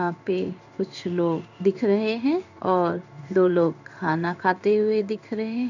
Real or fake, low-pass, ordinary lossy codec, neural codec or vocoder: fake; 7.2 kHz; none; codec, 16 kHz in and 24 kHz out, 1 kbps, XY-Tokenizer